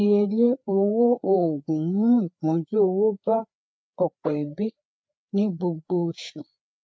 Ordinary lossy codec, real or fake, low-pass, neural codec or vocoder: none; fake; none; codec, 16 kHz, 4 kbps, FreqCodec, larger model